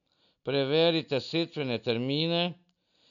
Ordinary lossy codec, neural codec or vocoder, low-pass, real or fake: none; none; 7.2 kHz; real